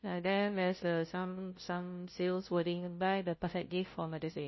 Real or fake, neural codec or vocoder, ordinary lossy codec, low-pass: fake; codec, 16 kHz, 0.5 kbps, FunCodec, trained on Chinese and English, 25 frames a second; MP3, 24 kbps; 7.2 kHz